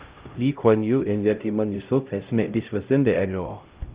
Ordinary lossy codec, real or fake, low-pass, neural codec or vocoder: Opus, 32 kbps; fake; 3.6 kHz; codec, 16 kHz, 0.5 kbps, X-Codec, HuBERT features, trained on LibriSpeech